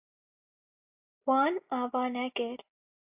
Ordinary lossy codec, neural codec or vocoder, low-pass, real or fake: AAC, 32 kbps; none; 3.6 kHz; real